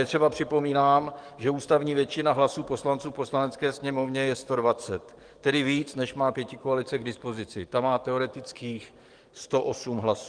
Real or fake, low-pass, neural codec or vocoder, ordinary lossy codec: fake; 9.9 kHz; codec, 44.1 kHz, 7.8 kbps, DAC; Opus, 24 kbps